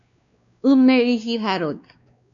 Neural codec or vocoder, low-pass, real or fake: codec, 16 kHz, 2 kbps, X-Codec, WavLM features, trained on Multilingual LibriSpeech; 7.2 kHz; fake